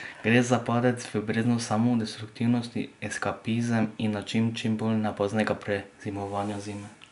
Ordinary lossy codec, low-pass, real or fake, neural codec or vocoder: none; 10.8 kHz; real; none